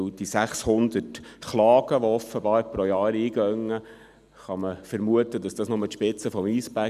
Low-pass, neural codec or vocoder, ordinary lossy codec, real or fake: 14.4 kHz; none; none; real